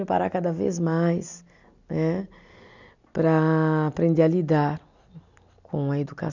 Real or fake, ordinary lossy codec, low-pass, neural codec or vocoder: real; none; 7.2 kHz; none